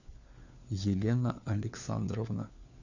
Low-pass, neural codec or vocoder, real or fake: 7.2 kHz; codec, 16 kHz, 4 kbps, FunCodec, trained on LibriTTS, 50 frames a second; fake